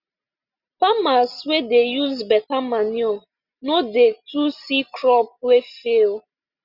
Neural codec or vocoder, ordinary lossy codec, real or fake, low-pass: none; Opus, 64 kbps; real; 5.4 kHz